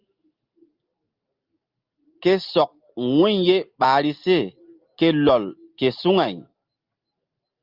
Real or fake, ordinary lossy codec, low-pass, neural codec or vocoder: real; Opus, 24 kbps; 5.4 kHz; none